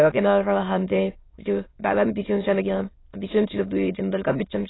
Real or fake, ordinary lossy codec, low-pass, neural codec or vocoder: fake; AAC, 16 kbps; 7.2 kHz; autoencoder, 22.05 kHz, a latent of 192 numbers a frame, VITS, trained on many speakers